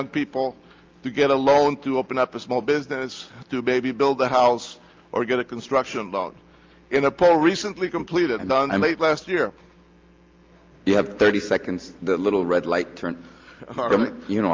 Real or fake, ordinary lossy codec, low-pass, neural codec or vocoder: real; Opus, 32 kbps; 7.2 kHz; none